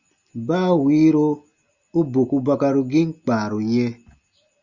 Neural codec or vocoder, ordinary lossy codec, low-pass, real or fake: none; Opus, 64 kbps; 7.2 kHz; real